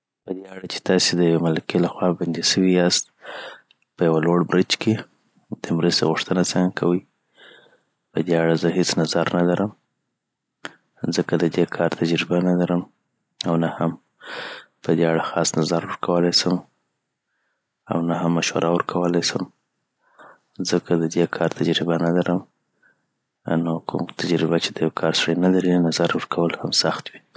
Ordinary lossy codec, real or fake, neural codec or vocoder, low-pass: none; real; none; none